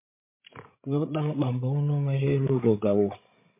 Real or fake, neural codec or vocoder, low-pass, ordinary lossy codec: fake; codec, 16 kHz, 16 kbps, FreqCodec, larger model; 3.6 kHz; MP3, 24 kbps